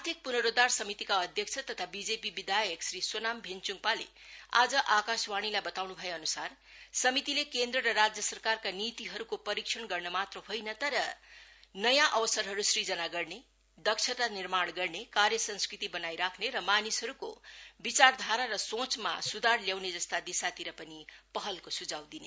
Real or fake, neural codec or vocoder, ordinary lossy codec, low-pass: real; none; none; none